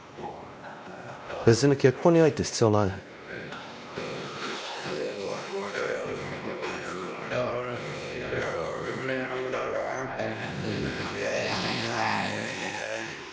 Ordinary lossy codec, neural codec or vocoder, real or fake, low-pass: none; codec, 16 kHz, 1 kbps, X-Codec, WavLM features, trained on Multilingual LibriSpeech; fake; none